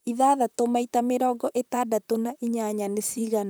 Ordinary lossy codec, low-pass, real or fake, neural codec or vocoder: none; none; fake; vocoder, 44.1 kHz, 128 mel bands, Pupu-Vocoder